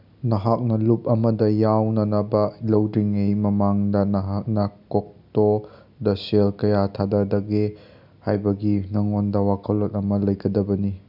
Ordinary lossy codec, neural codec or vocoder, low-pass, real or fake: none; none; 5.4 kHz; real